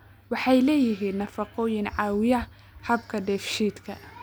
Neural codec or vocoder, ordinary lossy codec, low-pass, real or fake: none; none; none; real